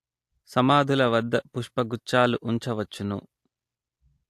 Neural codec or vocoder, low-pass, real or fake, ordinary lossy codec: none; 14.4 kHz; real; AAC, 64 kbps